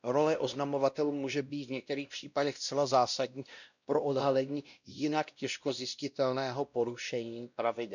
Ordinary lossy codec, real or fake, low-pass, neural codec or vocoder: none; fake; 7.2 kHz; codec, 16 kHz, 1 kbps, X-Codec, WavLM features, trained on Multilingual LibriSpeech